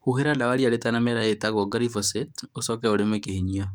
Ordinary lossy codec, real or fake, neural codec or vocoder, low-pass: none; fake; codec, 44.1 kHz, 7.8 kbps, DAC; none